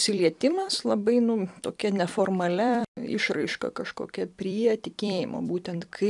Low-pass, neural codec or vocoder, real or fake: 10.8 kHz; vocoder, 44.1 kHz, 128 mel bands every 256 samples, BigVGAN v2; fake